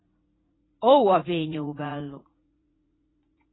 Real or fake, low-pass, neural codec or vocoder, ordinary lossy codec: fake; 7.2 kHz; vocoder, 44.1 kHz, 80 mel bands, Vocos; AAC, 16 kbps